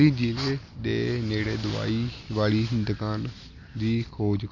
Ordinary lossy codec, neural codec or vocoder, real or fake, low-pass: none; none; real; 7.2 kHz